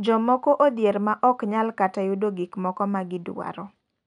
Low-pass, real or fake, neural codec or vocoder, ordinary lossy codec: 9.9 kHz; real; none; none